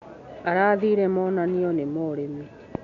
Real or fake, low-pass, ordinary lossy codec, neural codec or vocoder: real; 7.2 kHz; AAC, 64 kbps; none